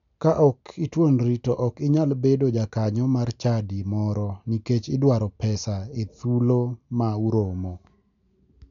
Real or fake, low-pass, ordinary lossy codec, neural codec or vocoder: real; 7.2 kHz; none; none